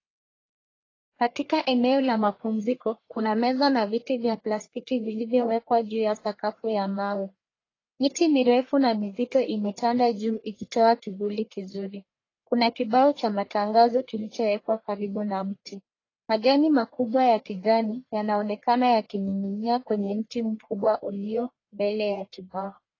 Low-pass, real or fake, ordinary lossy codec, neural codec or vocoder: 7.2 kHz; fake; AAC, 32 kbps; codec, 44.1 kHz, 1.7 kbps, Pupu-Codec